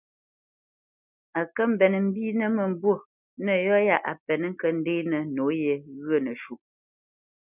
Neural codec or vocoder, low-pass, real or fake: none; 3.6 kHz; real